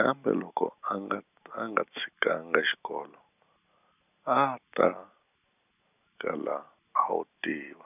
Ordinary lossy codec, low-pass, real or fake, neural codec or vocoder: none; 3.6 kHz; real; none